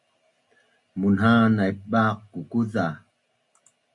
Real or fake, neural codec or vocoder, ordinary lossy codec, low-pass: real; none; MP3, 48 kbps; 10.8 kHz